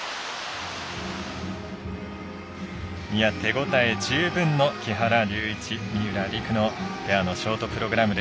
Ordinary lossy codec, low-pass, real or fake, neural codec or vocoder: none; none; real; none